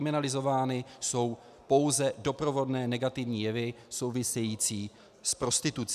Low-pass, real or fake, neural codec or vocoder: 14.4 kHz; real; none